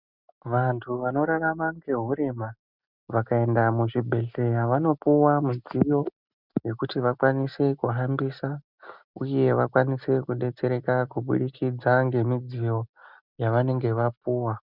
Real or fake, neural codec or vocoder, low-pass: real; none; 5.4 kHz